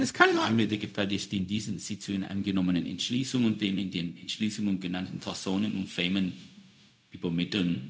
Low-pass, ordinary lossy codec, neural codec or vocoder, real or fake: none; none; codec, 16 kHz, 0.4 kbps, LongCat-Audio-Codec; fake